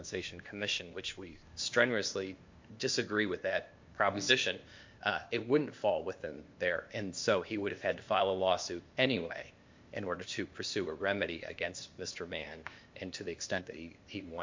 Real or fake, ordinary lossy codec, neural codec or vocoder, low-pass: fake; MP3, 48 kbps; codec, 16 kHz, 0.8 kbps, ZipCodec; 7.2 kHz